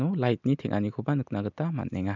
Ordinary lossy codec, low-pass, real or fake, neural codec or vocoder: none; 7.2 kHz; real; none